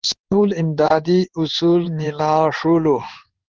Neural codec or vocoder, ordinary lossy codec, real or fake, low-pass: codec, 16 kHz in and 24 kHz out, 1 kbps, XY-Tokenizer; Opus, 16 kbps; fake; 7.2 kHz